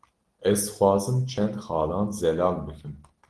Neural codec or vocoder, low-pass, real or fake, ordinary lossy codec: none; 10.8 kHz; real; Opus, 16 kbps